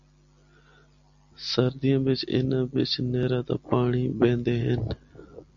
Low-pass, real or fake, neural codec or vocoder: 7.2 kHz; real; none